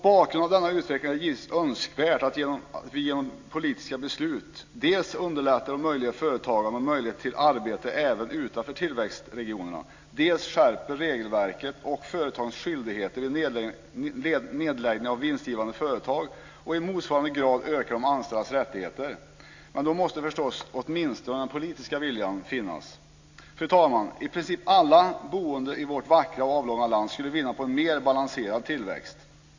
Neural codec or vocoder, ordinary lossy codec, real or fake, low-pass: none; AAC, 48 kbps; real; 7.2 kHz